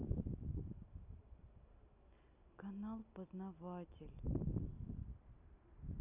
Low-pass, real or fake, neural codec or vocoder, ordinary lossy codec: 3.6 kHz; real; none; none